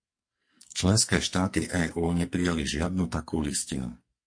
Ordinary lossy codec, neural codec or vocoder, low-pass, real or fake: MP3, 48 kbps; codec, 44.1 kHz, 2.6 kbps, SNAC; 9.9 kHz; fake